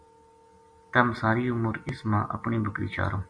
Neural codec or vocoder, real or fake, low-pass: none; real; 9.9 kHz